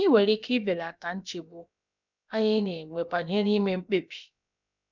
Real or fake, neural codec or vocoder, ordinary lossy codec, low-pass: fake; codec, 16 kHz, about 1 kbps, DyCAST, with the encoder's durations; none; 7.2 kHz